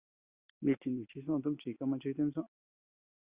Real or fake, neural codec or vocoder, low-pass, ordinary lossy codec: real; none; 3.6 kHz; Opus, 64 kbps